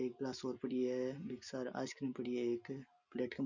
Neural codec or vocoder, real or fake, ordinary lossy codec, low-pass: none; real; none; 7.2 kHz